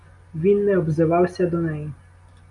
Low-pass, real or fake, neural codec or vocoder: 10.8 kHz; real; none